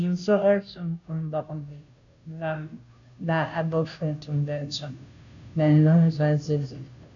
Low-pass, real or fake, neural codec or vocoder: 7.2 kHz; fake; codec, 16 kHz, 0.5 kbps, FunCodec, trained on Chinese and English, 25 frames a second